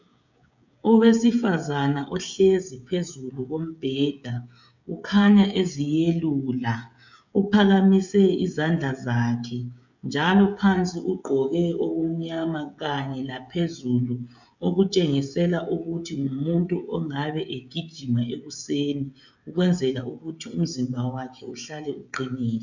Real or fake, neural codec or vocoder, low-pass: fake; codec, 16 kHz, 16 kbps, FreqCodec, smaller model; 7.2 kHz